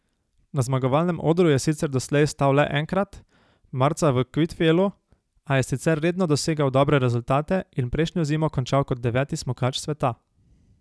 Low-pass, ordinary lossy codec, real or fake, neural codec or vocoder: none; none; real; none